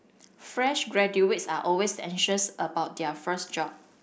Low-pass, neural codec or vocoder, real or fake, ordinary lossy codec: none; none; real; none